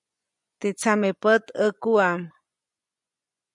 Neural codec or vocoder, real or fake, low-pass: vocoder, 24 kHz, 100 mel bands, Vocos; fake; 10.8 kHz